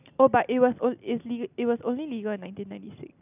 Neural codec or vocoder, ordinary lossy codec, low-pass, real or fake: none; none; 3.6 kHz; real